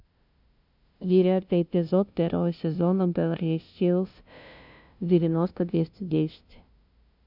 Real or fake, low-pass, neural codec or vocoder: fake; 5.4 kHz; codec, 16 kHz, 0.5 kbps, FunCodec, trained on LibriTTS, 25 frames a second